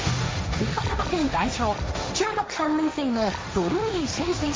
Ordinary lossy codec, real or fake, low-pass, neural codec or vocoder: none; fake; none; codec, 16 kHz, 1.1 kbps, Voila-Tokenizer